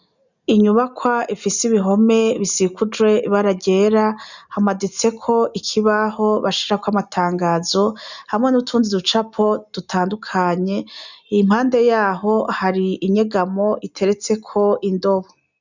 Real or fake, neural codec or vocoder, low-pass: real; none; 7.2 kHz